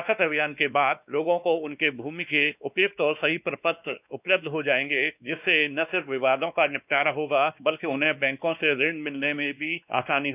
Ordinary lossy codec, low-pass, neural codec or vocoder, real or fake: none; 3.6 kHz; codec, 16 kHz, 2 kbps, X-Codec, WavLM features, trained on Multilingual LibriSpeech; fake